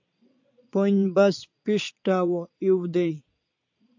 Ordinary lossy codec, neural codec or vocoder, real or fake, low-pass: AAC, 48 kbps; vocoder, 22.05 kHz, 80 mel bands, Vocos; fake; 7.2 kHz